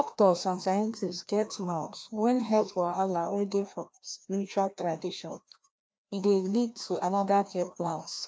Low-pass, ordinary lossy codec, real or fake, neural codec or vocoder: none; none; fake; codec, 16 kHz, 1 kbps, FreqCodec, larger model